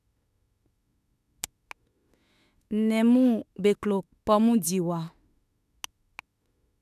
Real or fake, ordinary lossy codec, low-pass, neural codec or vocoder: fake; none; 14.4 kHz; autoencoder, 48 kHz, 32 numbers a frame, DAC-VAE, trained on Japanese speech